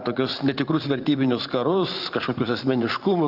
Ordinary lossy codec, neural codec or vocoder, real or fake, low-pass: Opus, 32 kbps; vocoder, 44.1 kHz, 128 mel bands every 512 samples, BigVGAN v2; fake; 5.4 kHz